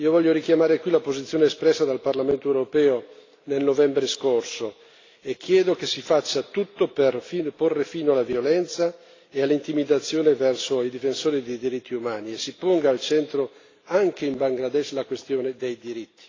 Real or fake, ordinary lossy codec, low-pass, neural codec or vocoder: real; AAC, 32 kbps; 7.2 kHz; none